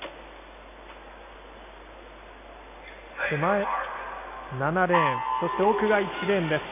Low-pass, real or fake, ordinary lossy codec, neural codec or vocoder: 3.6 kHz; real; AAC, 24 kbps; none